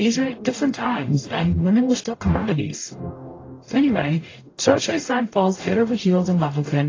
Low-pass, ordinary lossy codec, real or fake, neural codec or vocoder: 7.2 kHz; AAC, 32 kbps; fake; codec, 44.1 kHz, 0.9 kbps, DAC